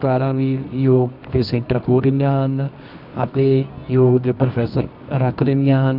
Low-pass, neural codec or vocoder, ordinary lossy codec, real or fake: 5.4 kHz; codec, 24 kHz, 0.9 kbps, WavTokenizer, medium music audio release; none; fake